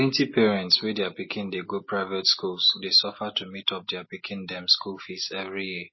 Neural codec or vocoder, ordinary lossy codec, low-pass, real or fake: none; MP3, 24 kbps; 7.2 kHz; real